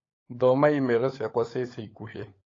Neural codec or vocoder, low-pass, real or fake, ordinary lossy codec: codec, 16 kHz, 16 kbps, FunCodec, trained on LibriTTS, 50 frames a second; 7.2 kHz; fake; AAC, 32 kbps